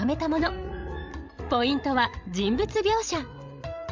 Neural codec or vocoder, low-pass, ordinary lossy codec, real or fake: codec, 16 kHz, 16 kbps, FreqCodec, larger model; 7.2 kHz; MP3, 64 kbps; fake